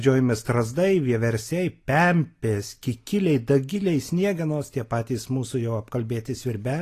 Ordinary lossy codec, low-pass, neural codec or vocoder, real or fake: AAC, 48 kbps; 14.4 kHz; none; real